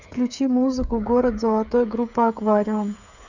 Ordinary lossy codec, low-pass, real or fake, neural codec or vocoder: none; 7.2 kHz; fake; codec, 16 kHz, 8 kbps, FreqCodec, smaller model